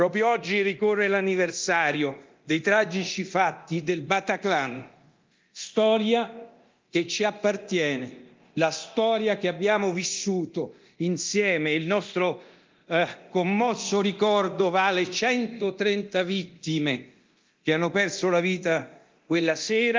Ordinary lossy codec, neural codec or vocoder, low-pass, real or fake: Opus, 32 kbps; codec, 24 kHz, 0.9 kbps, DualCodec; 7.2 kHz; fake